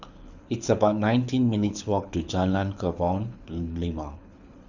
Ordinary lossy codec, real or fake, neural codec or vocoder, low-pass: none; fake; codec, 24 kHz, 6 kbps, HILCodec; 7.2 kHz